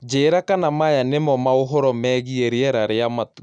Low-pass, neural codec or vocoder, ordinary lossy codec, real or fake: 9.9 kHz; none; none; real